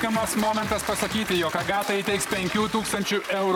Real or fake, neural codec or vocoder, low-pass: fake; vocoder, 44.1 kHz, 128 mel bands, Pupu-Vocoder; 19.8 kHz